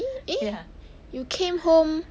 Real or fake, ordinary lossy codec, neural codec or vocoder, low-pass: real; none; none; none